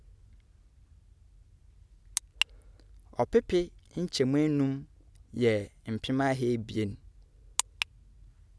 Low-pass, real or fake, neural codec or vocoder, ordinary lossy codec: none; real; none; none